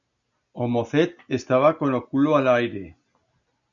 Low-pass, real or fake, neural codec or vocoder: 7.2 kHz; real; none